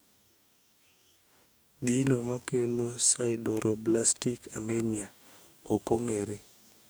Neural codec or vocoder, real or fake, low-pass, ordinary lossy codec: codec, 44.1 kHz, 2.6 kbps, DAC; fake; none; none